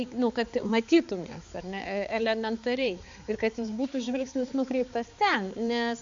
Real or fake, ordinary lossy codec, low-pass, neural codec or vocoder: fake; AAC, 64 kbps; 7.2 kHz; codec, 16 kHz, 4 kbps, X-Codec, HuBERT features, trained on balanced general audio